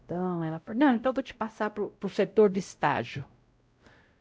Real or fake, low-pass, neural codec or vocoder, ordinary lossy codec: fake; none; codec, 16 kHz, 0.5 kbps, X-Codec, WavLM features, trained on Multilingual LibriSpeech; none